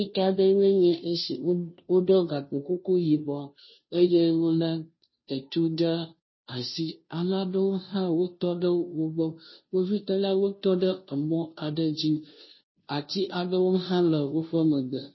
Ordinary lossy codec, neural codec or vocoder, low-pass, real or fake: MP3, 24 kbps; codec, 16 kHz, 0.5 kbps, FunCodec, trained on Chinese and English, 25 frames a second; 7.2 kHz; fake